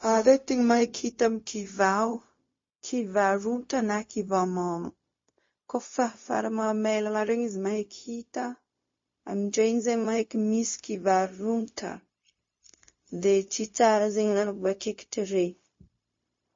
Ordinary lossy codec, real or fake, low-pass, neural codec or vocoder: MP3, 32 kbps; fake; 7.2 kHz; codec, 16 kHz, 0.4 kbps, LongCat-Audio-Codec